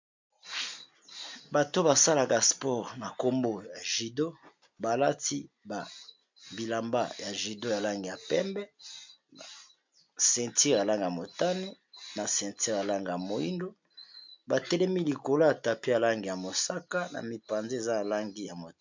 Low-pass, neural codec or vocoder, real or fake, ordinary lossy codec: 7.2 kHz; none; real; MP3, 64 kbps